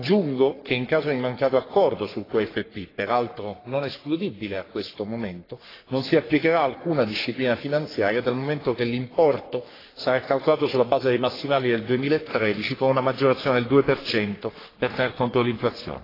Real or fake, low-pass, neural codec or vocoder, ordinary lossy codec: fake; 5.4 kHz; codec, 44.1 kHz, 3.4 kbps, Pupu-Codec; AAC, 24 kbps